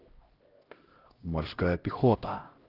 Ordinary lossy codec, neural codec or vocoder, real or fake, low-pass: Opus, 16 kbps; codec, 16 kHz, 0.5 kbps, X-Codec, HuBERT features, trained on LibriSpeech; fake; 5.4 kHz